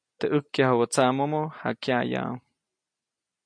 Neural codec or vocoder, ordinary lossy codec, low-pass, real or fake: none; MP3, 64 kbps; 9.9 kHz; real